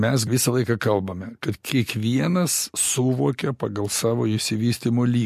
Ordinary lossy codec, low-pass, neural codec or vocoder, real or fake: MP3, 64 kbps; 14.4 kHz; none; real